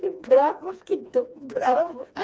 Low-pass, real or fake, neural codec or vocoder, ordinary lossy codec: none; fake; codec, 16 kHz, 2 kbps, FreqCodec, smaller model; none